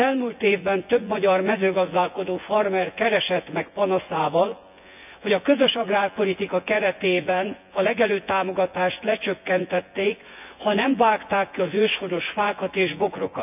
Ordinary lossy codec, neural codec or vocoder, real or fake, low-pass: none; vocoder, 24 kHz, 100 mel bands, Vocos; fake; 3.6 kHz